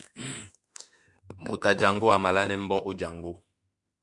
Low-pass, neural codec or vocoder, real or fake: 10.8 kHz; autoencoder, 48 kHz, 32 numbers a frame, DAC-VAE, trained on Japanese speech; fake